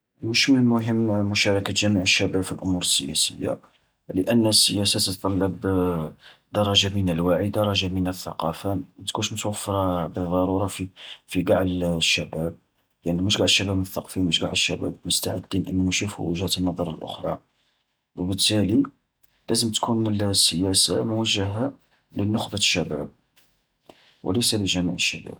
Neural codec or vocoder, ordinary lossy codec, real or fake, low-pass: none; none; real; none